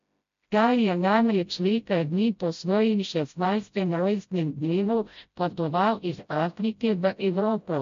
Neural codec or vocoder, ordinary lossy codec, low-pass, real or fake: codec, 16 kHz, 0.5 kbps, FreqCodec, smaller model; MP3, 48 kbps; 7.2 kHz; fake